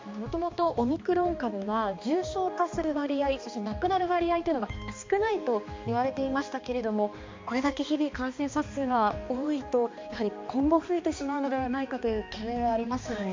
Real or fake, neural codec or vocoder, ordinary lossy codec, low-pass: fake; codec, 16 kHz, 2 kbps, X-Codec, HuBERT features, trained on balanced general audio; MP3, 48 kbps; 7.2 kHz